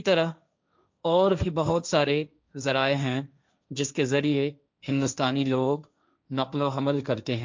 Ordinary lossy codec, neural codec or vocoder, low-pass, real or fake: MP3, 64 kbps; codec, 16 kHz, 1.1 kbps, Voila-Tokenizer; 7.2 kHz; fake